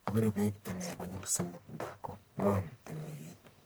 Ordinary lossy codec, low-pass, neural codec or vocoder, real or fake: none; none; codec, 44.1 kHz, 1.7 kbps, Pupu-Codec; fake